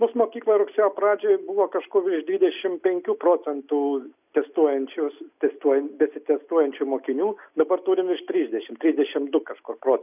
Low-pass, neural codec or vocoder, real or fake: 3.6 kHz; none; real